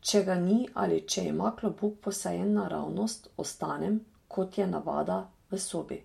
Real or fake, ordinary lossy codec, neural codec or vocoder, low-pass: real; MP3, 64 kbps; none; 19.8 kHz